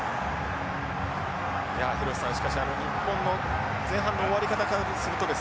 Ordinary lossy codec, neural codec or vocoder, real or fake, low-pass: none; none; real; none